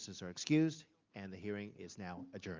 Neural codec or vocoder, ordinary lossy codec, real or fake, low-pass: none; Opus, 24 kbps; real; 7.2 kHz